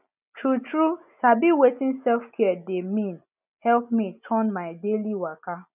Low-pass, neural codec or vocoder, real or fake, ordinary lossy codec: 3.6 kHz; none; real; none